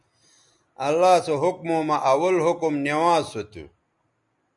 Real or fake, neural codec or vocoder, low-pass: real; none; 10.8 kHz